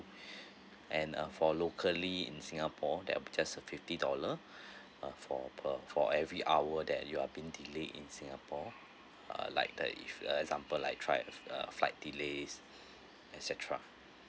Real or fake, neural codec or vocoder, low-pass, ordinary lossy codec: real; none; none; none